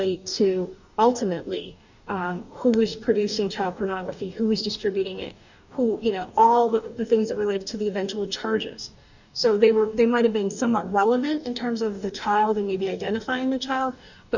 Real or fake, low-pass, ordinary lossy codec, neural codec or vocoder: fake; 7.2 kHz; Opus, 64 kbps; codec, 44.1 kHz, 2.6 kbps, DAC